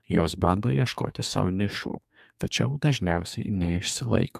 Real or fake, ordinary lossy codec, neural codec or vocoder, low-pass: fake; MP3, 96 kbps; codec, 32 kHz, 1.9 kbps, SNAC; 14.4 kHz